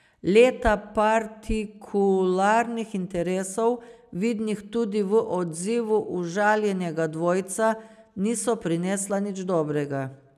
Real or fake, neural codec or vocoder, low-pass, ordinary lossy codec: real; none; 14.4 kHz; none